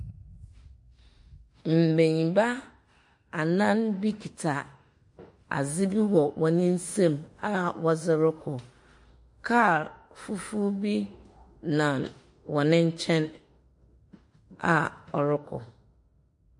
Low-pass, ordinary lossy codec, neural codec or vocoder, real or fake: 10.8 kHz; MP3, 48 kbps; autoencoder, 48 kHz, 32 numbers a frame, DAC-VAE, trained on Japanese speech; fake